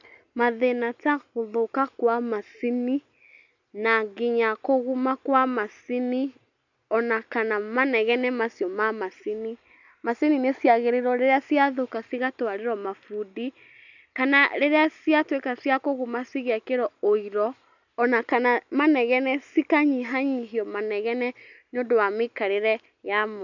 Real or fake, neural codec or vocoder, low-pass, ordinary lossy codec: real; none; 7.2 kHz; none